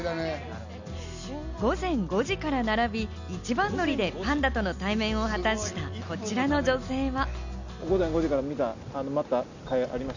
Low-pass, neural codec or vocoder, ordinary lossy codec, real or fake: 7.2 kHz; none; none; real